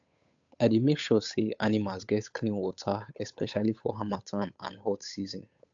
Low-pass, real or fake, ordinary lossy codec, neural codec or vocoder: 7.2 kHz; fake; AAC, 64 kbps; codec, 16 kHz, 8 kbps, FunCodec, trained on Chinese and English, 25 frames a second